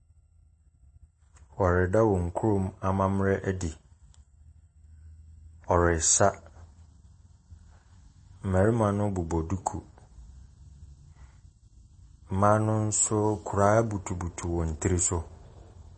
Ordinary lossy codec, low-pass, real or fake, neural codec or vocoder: MP3, 32 kbps; 9.9 kHz; real; none